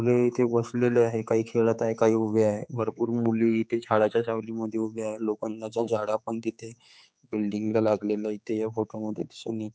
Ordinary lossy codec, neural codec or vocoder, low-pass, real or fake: none; codec, 16 kHz, 4 kbps, X-Codec, HuBERT features, trained on general audio; none; fake